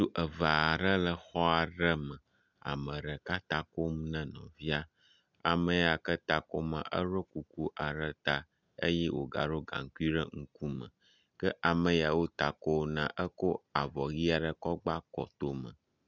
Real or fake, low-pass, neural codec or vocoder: real; 7.2 kHz; none